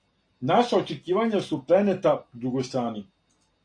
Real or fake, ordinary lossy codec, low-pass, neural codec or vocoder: real; AAC, 48 kbps; 9.9 kHz; none